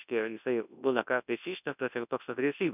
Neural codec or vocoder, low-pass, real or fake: codec, 24 kHz, 0.9 kbps, WavTokenizer, large speech release; 3.6 kHz; fake